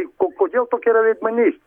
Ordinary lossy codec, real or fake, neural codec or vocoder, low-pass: Opus, 64 kbps; real; none; 19.8 kHz